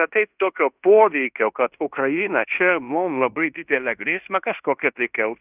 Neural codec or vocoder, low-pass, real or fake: codec, 16 kHz in and 24 kHz out, 0.9 kbps, LongCat-Audio-Codec, fine tuned four codebook decoder; 3.6 kHz; fake